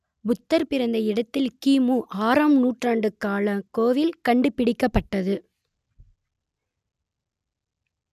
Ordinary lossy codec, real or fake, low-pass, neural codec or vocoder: none; real; 14.4 kHz; none